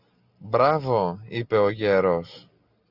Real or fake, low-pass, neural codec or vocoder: real; 5.4 kHz; none